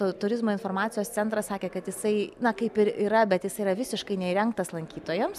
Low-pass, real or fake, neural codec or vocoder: 14.4 kHz; real; none